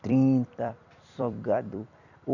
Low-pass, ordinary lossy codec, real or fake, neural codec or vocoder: 7.2 kHz; none; fake; vocoder, 44.1 kHz, 128 mel bands every 256 samples, BigVGAN v2